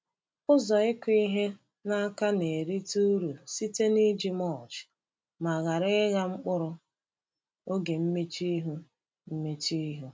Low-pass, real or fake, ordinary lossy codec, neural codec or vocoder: none; real; none; none